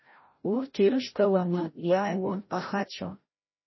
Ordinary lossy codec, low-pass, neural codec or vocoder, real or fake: MP3, 24 kbps; 7.2 kHz; codec, 16 kHz, 0.5 kbps, FreqCodec, larger model; fake